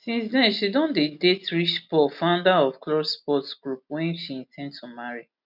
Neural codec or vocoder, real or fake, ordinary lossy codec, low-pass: none; real; none; 5.4 kHz